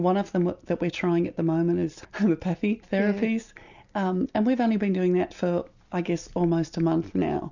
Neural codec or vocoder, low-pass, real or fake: none; 7.2 kHz; real